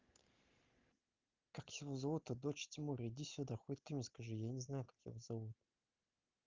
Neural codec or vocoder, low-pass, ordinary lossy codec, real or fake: none; 7.2 kHz; Opus, 32 kbps; real